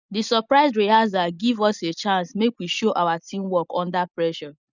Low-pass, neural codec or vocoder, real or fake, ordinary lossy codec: 7.2 kHz; none; real; none